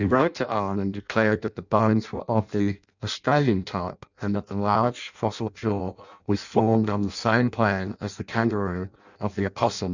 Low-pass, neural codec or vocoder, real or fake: 7.2 kHz; codec, 16 kHz in and 24 kHz out, 0.6 kbps, FireRedTTS-2 codec; fake